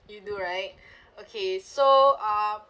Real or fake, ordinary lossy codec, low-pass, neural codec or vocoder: real; none; none; none